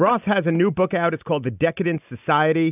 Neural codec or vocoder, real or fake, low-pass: none; real; 3.6 kHz